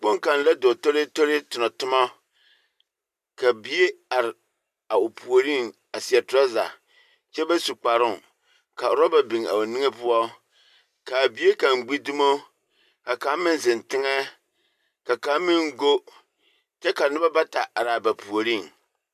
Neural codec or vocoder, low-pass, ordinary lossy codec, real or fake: none; 14.4 kHz; MP3, 96 kbps; real